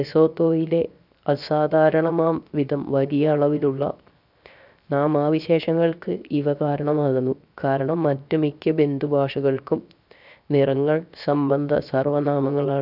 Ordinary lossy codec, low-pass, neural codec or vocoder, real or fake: none; 5.4 kHz; codec, 16 kHz, 0.7 kbps, FocalCodec; fake